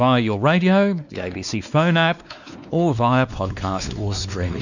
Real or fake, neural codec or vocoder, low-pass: fake; codec, 16 kHz, 2 kbps, X-Codec, WavLM features, trained on Multilingual LibriSpeech; 7.2 kHz